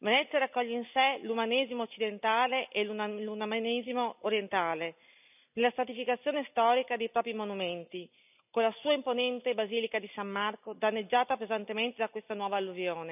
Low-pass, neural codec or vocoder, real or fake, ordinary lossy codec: 3.6 kHz; none; real; none